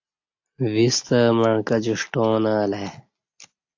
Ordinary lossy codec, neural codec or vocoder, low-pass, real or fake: AAC, 48 kbps; none; 7.2 kHz; real